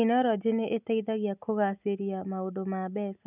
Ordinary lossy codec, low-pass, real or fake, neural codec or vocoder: none; 3.6 kHz; real; none